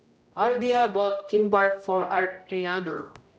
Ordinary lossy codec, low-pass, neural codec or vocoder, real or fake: none; none; codec, 16 kHz, 0.5 kbps, X-Codec, HuBERT features, trained on general audio; fake